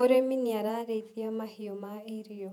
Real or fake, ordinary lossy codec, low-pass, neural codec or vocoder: fake; none; 19.8 kHz; vocoder, 48 kHz, 128 mel bands, Vocos